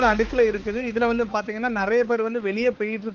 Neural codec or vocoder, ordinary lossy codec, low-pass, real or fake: codec, 16 kHz, 4 kbps, X-Codec, HuBERT features, trained on balanced general audio; Opus, 16 kbps; 7.2 kHz; fake